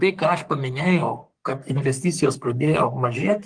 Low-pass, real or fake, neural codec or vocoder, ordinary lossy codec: 9.9 kHz; fake; codec, 44.1 kHz, 3.4 kbps, Pupu-Codec; Opus, 24 kbps